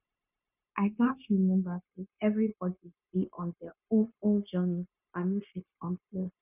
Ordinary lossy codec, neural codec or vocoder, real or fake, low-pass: none; codec, 16 kHz, 0.9 kbps, LongCat-Audio-Codec; fake; 3.6 kHz